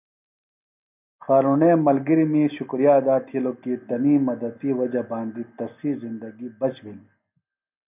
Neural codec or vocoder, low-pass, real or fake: none; 3.6 kHz; real